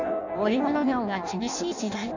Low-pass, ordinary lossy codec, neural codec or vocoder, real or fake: 7.2 kHz; none; codec, 16 kHz in and 24 kHz out, 0.6 kbps, FireRedTTS-2 codec; fake